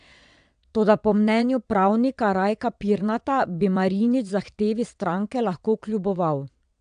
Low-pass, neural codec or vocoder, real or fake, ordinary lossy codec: 9.9 kHz; vocoder, 22.05 kHz, 80 mel bands, WaveNeXt; fake; AAC, 96 kbps